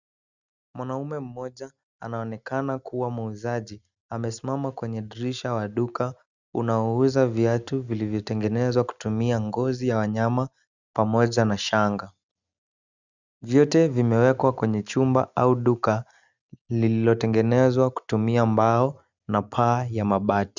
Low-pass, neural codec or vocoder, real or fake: 7.2 kHz; none; real